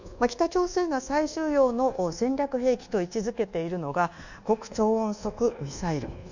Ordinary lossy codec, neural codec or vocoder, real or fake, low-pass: none; codec, 24 kHz, 1.2 kbps, DualCodec; fake; 7.2 kHz